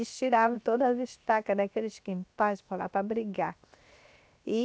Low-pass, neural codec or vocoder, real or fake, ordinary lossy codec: none; codec, 16 kHz, 0.7 kbps, FocalCodec; fake; none